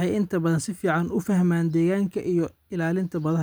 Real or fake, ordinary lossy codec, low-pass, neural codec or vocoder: real; none; none; none